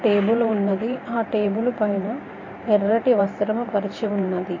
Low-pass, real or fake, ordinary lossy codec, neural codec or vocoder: 7.2 kHz; fake; MP3, 32 kbps; vocoder, 44.1 kHz, 128 mel bands every 512 samples, BigVGAN v2